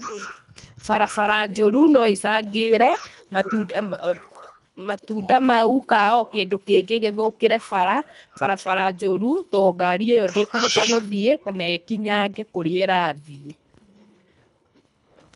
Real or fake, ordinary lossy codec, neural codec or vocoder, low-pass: fake; none; codec, 24 kHz, 1.5 kbps, HILCodec; 10.8 kHz